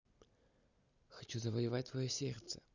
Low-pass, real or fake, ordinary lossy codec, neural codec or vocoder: 7.2 kHz; real; none; none